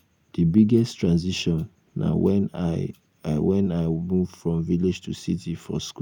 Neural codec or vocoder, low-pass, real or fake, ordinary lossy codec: none; 19.8 kHz; real; none